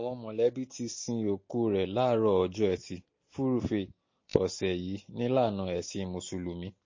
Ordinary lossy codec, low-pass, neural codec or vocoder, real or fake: MP3, 32 kbps; 7.2 kHz; none; real